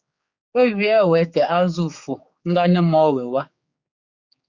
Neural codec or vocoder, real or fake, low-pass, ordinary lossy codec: codec, 16 kHz, 4 kbps, X-Codec, HuBERT features, trained on general audio; fake; 7.2 kHz; Opus, 64 kbps